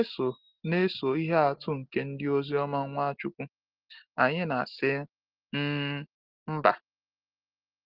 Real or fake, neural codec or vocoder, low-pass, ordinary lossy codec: real; none; 5.4 kHz; Opus, 16 kbps